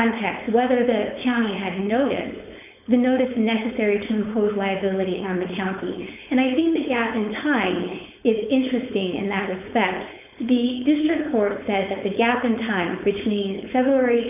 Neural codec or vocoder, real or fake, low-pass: codec, 16 kHz, 4.8 kbps, FACodec; fake; 3.6 kHz